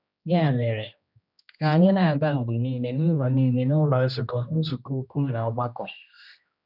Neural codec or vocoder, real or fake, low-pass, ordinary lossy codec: codec, 16 kHz, 1 kbps, X-Codec, HuBERT features, trained on general audio; fake; 5.4 kHz; none